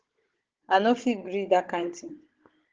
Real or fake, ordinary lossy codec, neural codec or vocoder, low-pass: fake; Opus, 16 kbps; codec, 16 kHz, 16 kbps, FunCodec, trained on Chinese and English, 50 frames a second; 7.2 kHz